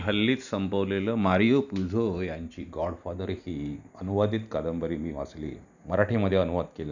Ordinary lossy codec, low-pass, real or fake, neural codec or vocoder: none; 7.2 kHz; real; none